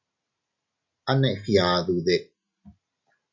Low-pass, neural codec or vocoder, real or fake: 7.2 kHz; none; real